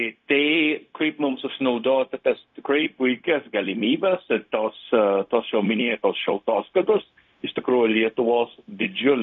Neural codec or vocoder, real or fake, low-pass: codec, 16 kHz, 0.4 kbps, LongCat-Audio-Codec; fake; 7.2 kHz